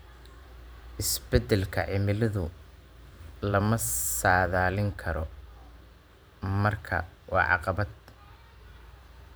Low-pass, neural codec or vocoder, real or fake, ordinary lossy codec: none; vocoder, 44.1 kHz, 128 mel bands every 512 samples, BigVGAN v2; fake; none